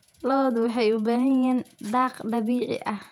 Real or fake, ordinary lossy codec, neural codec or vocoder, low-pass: fake; none; vocoder, 48 kHz, 128 mel bands, Vocos; 19.8 kHz